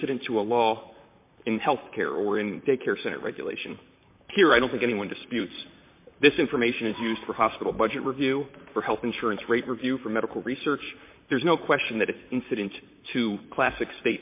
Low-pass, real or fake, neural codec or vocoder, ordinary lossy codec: 3.6 kHz; fake; vocoder, 44.1 kHz, 128 mel bands, Pupu-Vocoder; MP3, 24 kbps